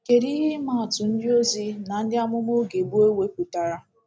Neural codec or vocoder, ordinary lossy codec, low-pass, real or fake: none; none; none; real